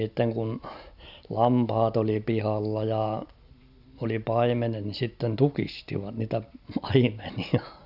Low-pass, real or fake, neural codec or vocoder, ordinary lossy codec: 5.4 kHz; real; none; none